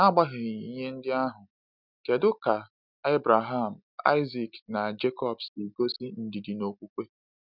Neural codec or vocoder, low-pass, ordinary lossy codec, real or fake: none; 5.4 kHz; none; real